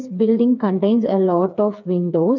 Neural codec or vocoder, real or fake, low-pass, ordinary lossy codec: codec, 16 kHz, 4 kbps, FreqCodec, smaller model; fake; 7.2 kHz; none